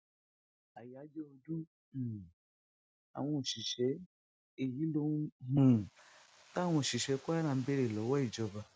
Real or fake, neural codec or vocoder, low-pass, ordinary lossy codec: real; none; none; none